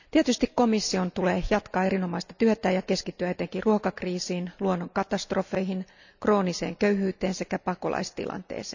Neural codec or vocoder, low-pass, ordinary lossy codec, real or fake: none; 7.2 kHz; none; real